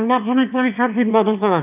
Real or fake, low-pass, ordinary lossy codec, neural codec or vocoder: fake; 3.6 kHz; AAC, 32 kbps; autoencoder, 22.05 kHz, a latent of 192 numbers a frame, VITS, trained on one speaker